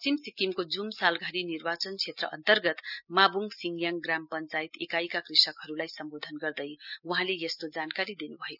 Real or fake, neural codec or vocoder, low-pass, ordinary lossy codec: real; none; 5.4 kHz; none